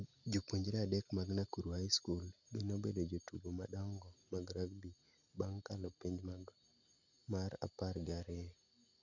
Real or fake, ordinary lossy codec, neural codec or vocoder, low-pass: real; none; none; 7.2 kHz